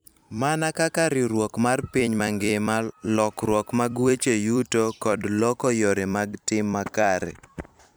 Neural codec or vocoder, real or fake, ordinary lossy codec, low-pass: vocoder, 44.1 kHz, 128 mel bands every 256 samples, BigVGAN v2; fake; none; none